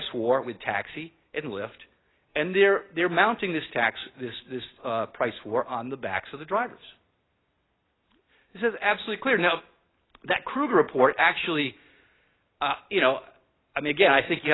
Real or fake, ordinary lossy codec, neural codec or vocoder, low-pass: real; AAC, 16 kbps; none; 7.2 kHz